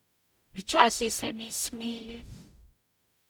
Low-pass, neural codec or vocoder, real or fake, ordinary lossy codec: none; codec, 44.1 kHz, 0.9 kbps, DAC; fake; none